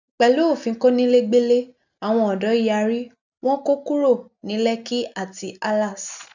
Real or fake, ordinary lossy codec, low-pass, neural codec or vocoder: real; none; 7.2 kHz; none